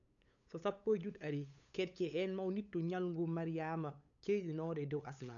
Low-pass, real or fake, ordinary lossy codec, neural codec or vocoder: 7.2 kHz; fake; none; codec, 16 kHz, 8 kbps, FunCodec, trained on LibriTTS, 25 frames a second